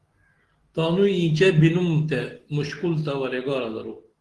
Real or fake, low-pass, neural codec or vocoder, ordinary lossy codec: real; 10.8 kHz; none; Opus, 16 kbps